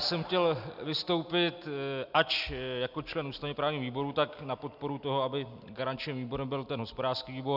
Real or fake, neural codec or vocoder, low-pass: real; none; 5.4 kHz